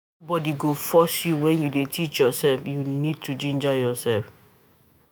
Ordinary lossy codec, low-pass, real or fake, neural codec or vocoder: none; none; fake; autoencoder, 48 kHz, 128 numbers a frame, DAC-VAE, trained on Japanese speech